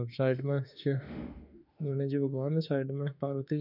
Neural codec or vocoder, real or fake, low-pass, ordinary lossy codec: autoencoder, 48 kHz, 32 numbers a frame, DAC-VAE, trained on Japanese speech; fake; 5.4 kHz; none